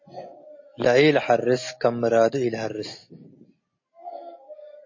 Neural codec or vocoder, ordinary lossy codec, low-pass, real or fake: none; MP3, 32 kbps; 7.2 kHz; real